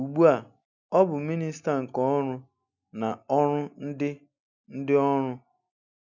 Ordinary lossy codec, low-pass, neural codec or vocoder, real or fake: none; 7.2 kHz; none; real